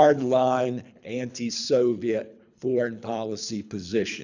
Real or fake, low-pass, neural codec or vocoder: fake; 7.2 kHz; codec, 24 kHz, 3 kbps, HILCodec